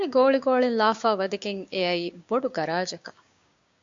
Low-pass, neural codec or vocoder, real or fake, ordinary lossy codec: 7.2 kHz; codec, 16 kHz, 6 kbps, DAC; fake; AAC, 64 kbps